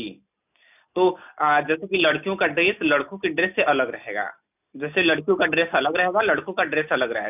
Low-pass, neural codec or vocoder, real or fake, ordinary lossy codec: 3.6 kHz; none; real; none